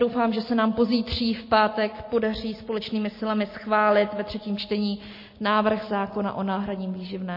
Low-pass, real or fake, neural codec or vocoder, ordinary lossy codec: 5.4 kHz; real; none; MP3, 24 kbps